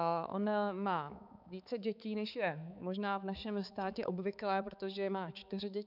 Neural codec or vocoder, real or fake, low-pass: codec, 16 kHz, 4 kbps, X-Codec, HuBERT features, trained on balanced general audio; fake; 5.4 kHz